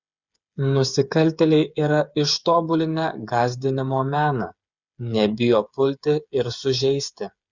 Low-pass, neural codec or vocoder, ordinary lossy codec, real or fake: 7.2 kHz; codec, 16 kHz, 8 kbps, FreqCodec, smaller model; Opus, 64 kbps; fake